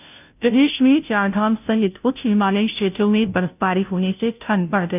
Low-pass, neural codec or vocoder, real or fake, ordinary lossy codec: 3.6 kHz; codec, 16 kHz, 0.5 kbps, FunCodec, trained on Chinese and English, 25 frames a second; fake; none